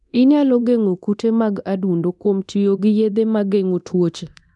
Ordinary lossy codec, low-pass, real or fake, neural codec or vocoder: none; 10.8 kHz; fake; codec, 24 kHz, 0.9 kbps, DualCodec